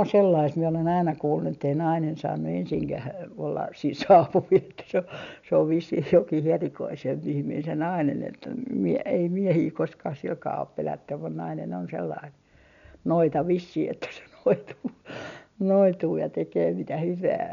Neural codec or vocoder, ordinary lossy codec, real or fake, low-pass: none; none; real; 7.2 kHz